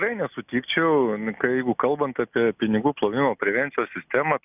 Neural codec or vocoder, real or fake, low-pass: none; real; 3.6 kHz